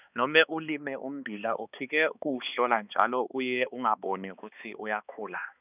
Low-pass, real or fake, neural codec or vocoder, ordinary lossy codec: 3.6 kHz; fake; codec, 16 kHz, 2 kbps, X-Codec, HuBERT features, trained on balanced general audio; none